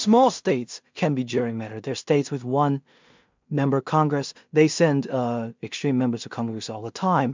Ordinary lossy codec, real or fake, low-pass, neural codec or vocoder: MP3, 64 kbps; fake; 7.2 kHz; codec, 16 kHz in and 24 kHz out, 0.4 kbps, LongCat-Audio-Codec, two codebook decoder